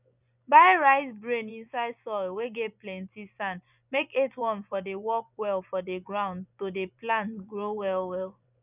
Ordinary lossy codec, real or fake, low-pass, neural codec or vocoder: none; fake; 3.6 kHz; vocoder, 24 kHz, 100 mel bands, Vocos